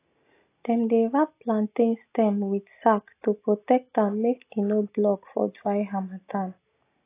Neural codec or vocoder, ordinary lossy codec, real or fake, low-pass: none; AAC, 24 kbps; real; 3.6 kHz